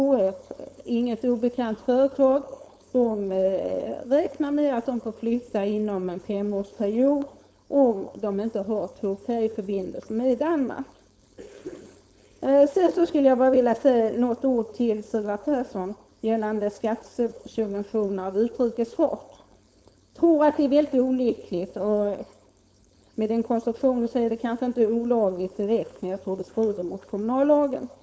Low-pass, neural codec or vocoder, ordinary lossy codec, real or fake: none; codec, 16 kHz, 4.8 kbps, FACodec; none; fake